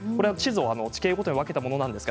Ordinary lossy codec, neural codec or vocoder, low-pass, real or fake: none; none; none; real